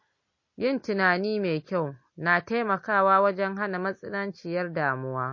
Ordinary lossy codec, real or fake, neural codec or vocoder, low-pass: MP3, 32 kbps; real; none; 7.2 kHz